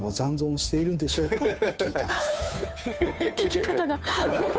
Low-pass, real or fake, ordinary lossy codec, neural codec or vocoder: none; fake; none; codec, 16 kHz, 2 kbps, FunCodec, trained on Chinese and English, 25 frames a second